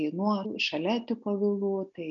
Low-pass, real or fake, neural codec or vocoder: 7.2 kHz; real; none